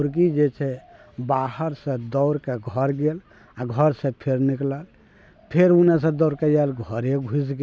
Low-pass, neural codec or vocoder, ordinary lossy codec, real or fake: none; none; none; real